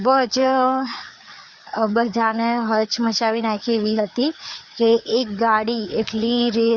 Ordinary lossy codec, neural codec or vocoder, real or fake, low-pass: Opus, 64 kbps; codec, 16 kHz, 4 kbps, FreqCodec, larger model; fake; 7.2 kHz